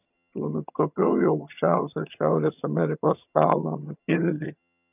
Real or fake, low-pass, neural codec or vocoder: fake; 3.6 kHz; vocoder, 22.05 kHz, 80 mel bands, HiFi-GAN